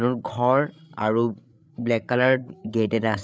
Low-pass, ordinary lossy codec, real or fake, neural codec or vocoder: none; none; fake; codec, 16 kHz, 8 kbps, FreqCodec, larger model